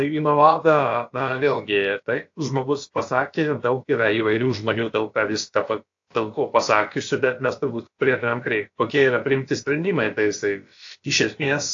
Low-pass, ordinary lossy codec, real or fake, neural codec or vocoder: 7.2 kHz; AAC, 48 kbps; fake; codec, 16 kHz, about 1 kbps, DyCAST, with the encoder's durations